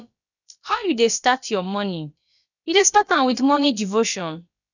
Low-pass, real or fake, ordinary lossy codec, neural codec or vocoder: 7.2 kHz; fake; none; codec, 16 kHz, about 1 kbps, DyCAST, with the encoder's durations